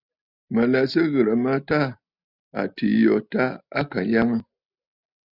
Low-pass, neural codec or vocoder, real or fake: 5.4 kHz; none; real